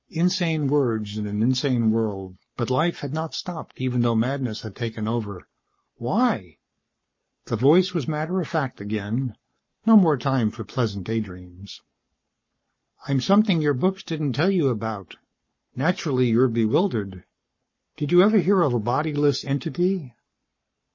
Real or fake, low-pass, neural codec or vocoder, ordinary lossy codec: fake; 7.2 kHz; codec, 44.1 kHz, 7.8 kbps, Pupu-Codec; MP3, 32 kbps